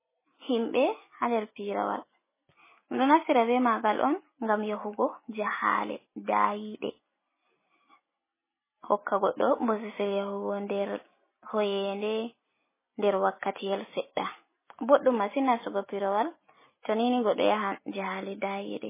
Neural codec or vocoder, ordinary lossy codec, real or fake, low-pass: none; MP3, 16 kbps; real; 3.6 kHz